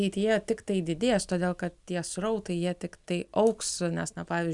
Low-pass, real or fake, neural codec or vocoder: 10.8 kHz; real; none